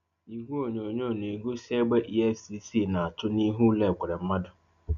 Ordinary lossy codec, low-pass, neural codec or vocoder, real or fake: none; 7.2 kHz; none; real